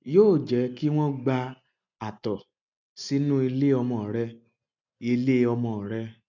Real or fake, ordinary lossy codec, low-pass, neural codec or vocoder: real; none; 7.2 kHz; none